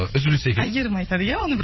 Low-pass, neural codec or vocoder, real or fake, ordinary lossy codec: 7.2 kHz; vocoder, 22.05 kHz, 80 mel bands, Vocos; fake; MP3, 24 kbps